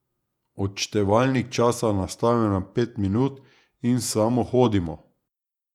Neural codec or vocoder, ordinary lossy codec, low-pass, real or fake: vocoder, 48 kHz, 128 mel bands, Vocos; none; 19.8 kHz; fake